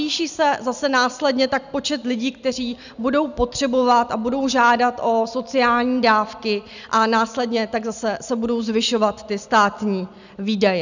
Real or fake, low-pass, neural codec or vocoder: real; 7.2 kHz; none